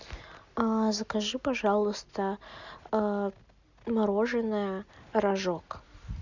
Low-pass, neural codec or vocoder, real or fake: 7.2 kHz; none; real